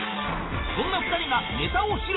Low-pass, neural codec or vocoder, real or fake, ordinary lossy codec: 7.2 kHz; none; real; AAC, 16 kbps